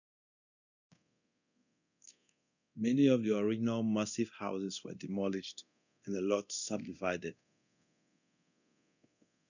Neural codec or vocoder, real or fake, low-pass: codec, 24 kHz, 0.9 kbps, DualCodec; fake; 7.2 kHz